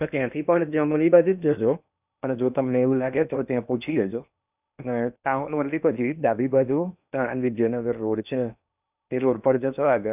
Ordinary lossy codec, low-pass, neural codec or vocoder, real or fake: none; 3.6 kHz; codec, 16 kHz in and 24 kHz out, 0.8 kbps, FocalCodec, streaming, 65536 codes; fake